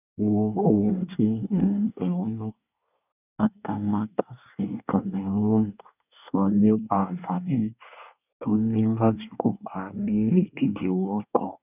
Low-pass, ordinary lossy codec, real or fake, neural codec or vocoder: 3.6 kHz; none; fake; codec, 24 kHz, 1 kbps, SNAC